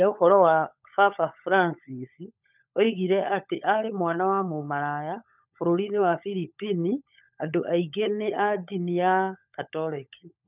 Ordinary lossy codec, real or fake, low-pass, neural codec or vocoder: none; fake; 3.6 kHz; codec, 16 kHz, 16 kbps, FunCodec, trained on LibriTTS, 50 frames a second